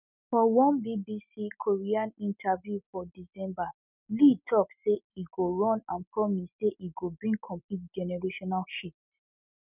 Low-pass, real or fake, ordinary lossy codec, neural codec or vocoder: 3.6 kHz; real; none; none